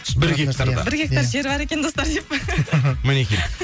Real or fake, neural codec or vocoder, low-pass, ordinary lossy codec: real; none; none; none